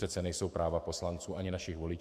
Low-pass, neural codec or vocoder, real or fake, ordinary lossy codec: 14.4 kHz; autoencoder, 48 kHz, 128 numbers a frame, DAC-VAE, trained on Japanese speech; fake; MP3, 64 kbps